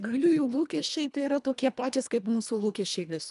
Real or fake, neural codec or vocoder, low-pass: fake; codec, 24 kHz, 1.5 kbps, HILCodec; 10.8 kHz